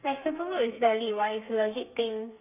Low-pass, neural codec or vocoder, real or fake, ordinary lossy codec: 3.6 kHz; codec, 32 kHz, 1.9 kbps, SNAC; fake; none